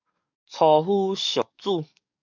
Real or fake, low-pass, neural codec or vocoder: fake; 7.2 kHz; codec, 44.1 kHz, 7.8 kbps, DAC